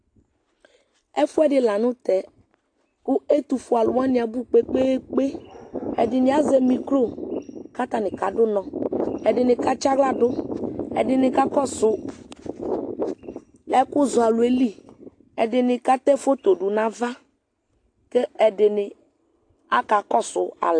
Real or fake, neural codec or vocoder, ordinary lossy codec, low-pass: real; none; AAC, 48 kbps; 9.9 kHz